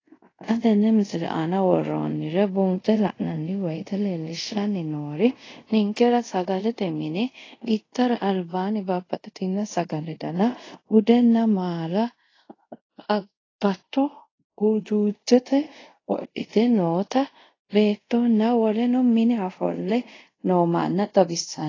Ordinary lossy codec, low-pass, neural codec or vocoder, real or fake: AAC, 32 kbps; 7.2 kHz; codec, 24 kHz, 0.5 kbps, DualCodec; fake